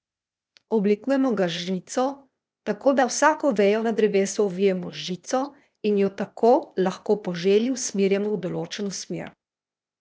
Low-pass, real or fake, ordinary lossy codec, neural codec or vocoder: none; fake; none; codec, 16 kHz, 0.8 kbps, ZipCodec